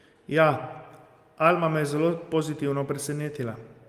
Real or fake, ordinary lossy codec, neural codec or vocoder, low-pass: real; Opus, 32 kbps; none; 14.4 kHz